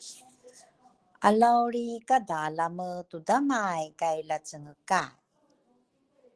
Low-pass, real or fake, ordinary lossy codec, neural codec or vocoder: 10.8 kHz; fake; Opus, 16 kbps; autoencoder, 48 kHz, 128 numbers a frame, DAC-VAE, trained on Japanese speech